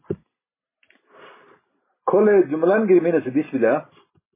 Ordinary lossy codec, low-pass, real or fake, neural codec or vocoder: MP3, 16 kbps; 3.6 kHz; real; none